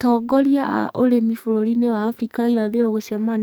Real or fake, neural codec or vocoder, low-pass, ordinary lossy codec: fake; codec, 44.1 kHz, 2.6 kbps, SNAC; none; none